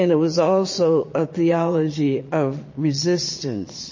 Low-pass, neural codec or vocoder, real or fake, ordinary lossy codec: 7.2 kHz; vocoder, 22.05 kHz, 80 mel bands, Vocos; fake; MP3, 32 kbps